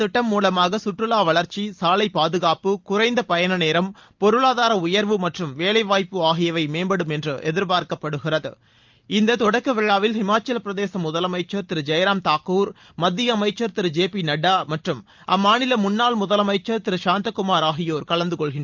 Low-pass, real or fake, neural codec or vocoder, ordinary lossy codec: 7.2 kHz; real; none; Opus, 32 kbps